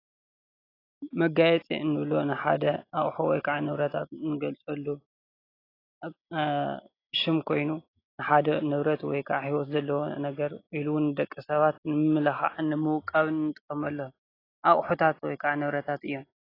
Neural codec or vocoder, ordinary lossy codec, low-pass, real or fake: none; AAC, 24 kbps; 5.4 kHz; real